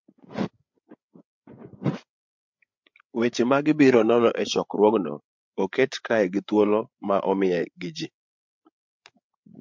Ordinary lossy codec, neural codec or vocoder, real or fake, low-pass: MP3, 64 kbps; codec, 16 kHz, 8 kbps, FreqCodec, larger model; fake; 7.2 kHz